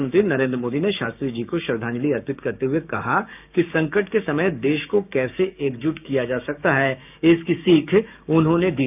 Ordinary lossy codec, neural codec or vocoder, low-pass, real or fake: none; codec, 16 kHz, 6 kbps, DAC; 3.6 kHz; fake